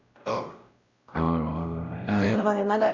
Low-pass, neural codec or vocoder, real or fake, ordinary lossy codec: 7.2 kHz; codec, 16 kHz, 0.5 kbps, X-Codec, WavLM features, trained on Multilingual LibriSpeech; fake; Opus, 64 kbps